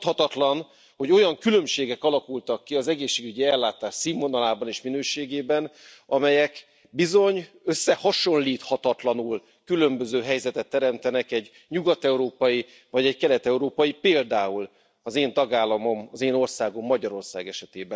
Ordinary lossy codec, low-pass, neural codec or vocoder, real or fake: none; none; none; real